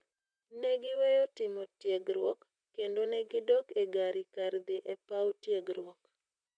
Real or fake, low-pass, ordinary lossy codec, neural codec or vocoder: fake; 9.9 kHz; none; vocoder, 44.1 kHz, 128 mel bands, Pupu-Vocoder